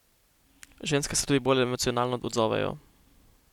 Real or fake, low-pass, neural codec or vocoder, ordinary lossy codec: real; 19.8 kHz; none; none